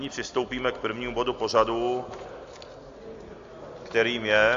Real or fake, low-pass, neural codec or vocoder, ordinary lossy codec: real; 7.2 kHz; none; AAC, 48 kbps